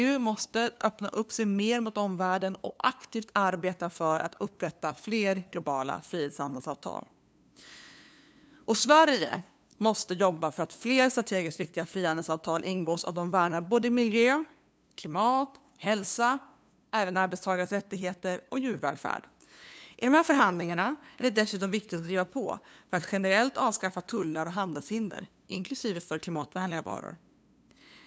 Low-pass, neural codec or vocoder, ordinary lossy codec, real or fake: none; codec, 16 kHz, 2 kbps, FunCodec, trained on LibriTTS, 25 frames a second; none; fake